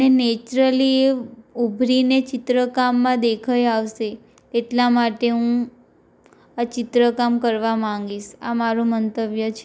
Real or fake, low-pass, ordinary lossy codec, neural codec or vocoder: real; none; none; none